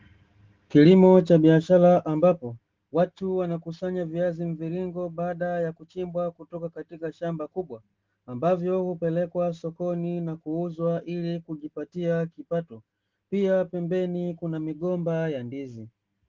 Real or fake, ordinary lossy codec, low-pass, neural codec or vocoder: real; Opus, 16 kbps; 7.2 kHz; none